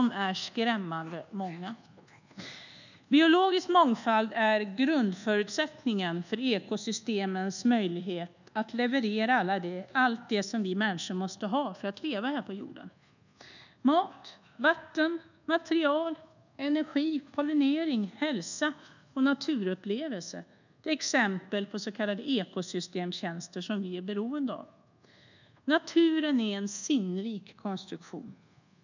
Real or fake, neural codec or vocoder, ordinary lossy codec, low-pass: fake; codec, 24 kHz, 1.2 kbps, DualCodec; none; 7.2 kHz